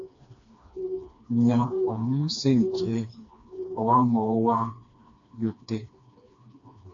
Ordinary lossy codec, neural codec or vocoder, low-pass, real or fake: AAC, 48 kbps; codec, 16 kHz, 2 kbps, FreqCodec, smaller model; 7.2 kHz; fake